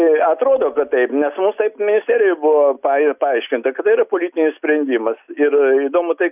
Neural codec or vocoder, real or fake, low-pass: none; real; 3.6 kHz